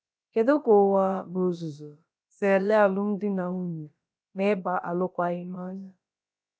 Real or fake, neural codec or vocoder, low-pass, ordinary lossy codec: fake; codec, 16 kHz, about 1 kbps, DyCAST, with the encoder's durations; none; none